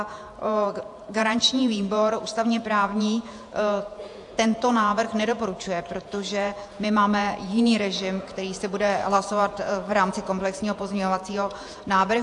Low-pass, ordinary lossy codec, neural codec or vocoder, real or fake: 10.8 kHz; AAC, 64 kbps; vocoder, 48 kHz, 128 mel bands, Vocos; fake